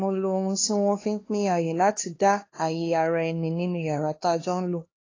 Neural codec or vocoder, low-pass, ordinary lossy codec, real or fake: codec, 16 kHz, 2 kbps, X-Codec, HuBERT features, trained on LibriSpeech; 7.2 kHz; AAC, 32 kbps; fake